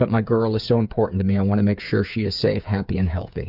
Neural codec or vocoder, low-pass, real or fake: codec, 16 kHz, 8 kbps, FreqCodec, smaller model; 5.4 kHz; fake